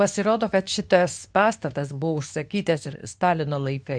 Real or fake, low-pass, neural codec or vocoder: fake; 9.9 kHz; codec, 24 kHz, 0.9 kbps, WavTokenizer, medium speech release version 2